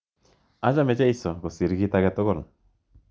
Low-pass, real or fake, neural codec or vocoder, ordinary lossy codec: none; real; none; none